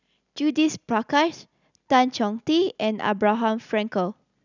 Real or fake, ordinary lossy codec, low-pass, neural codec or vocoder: real; none; 7.2 kHz; none